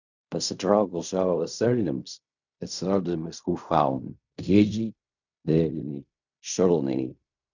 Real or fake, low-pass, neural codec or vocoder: fake; 7.2 kHz; codec, 16 kHz in and 24 kHz out, 0.4 kbps, LongCat-Audio-Codec, fine tuned four codebook decoder